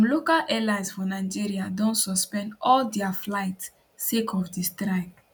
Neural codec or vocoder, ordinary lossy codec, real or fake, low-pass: none; none; real; none